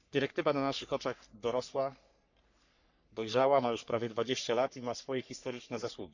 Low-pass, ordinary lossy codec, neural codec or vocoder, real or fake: 7.2 kHz; none; codec, 44.1 kHz, 3.4 kbps, Pupu-Codec; fake